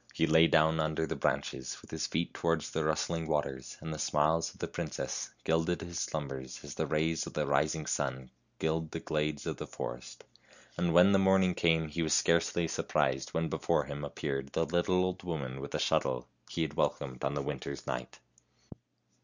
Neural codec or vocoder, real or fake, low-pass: none; real; 7.2 kHz